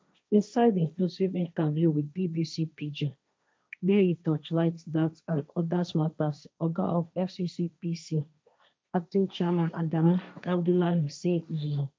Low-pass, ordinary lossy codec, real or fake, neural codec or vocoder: none; none; fake; codec, 16 kHz, 1.1 kbps, Voila-Tokenizer